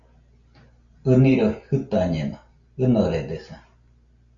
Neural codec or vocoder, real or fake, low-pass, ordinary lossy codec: none; real; 7.2 kHz; Opus, 64 kbps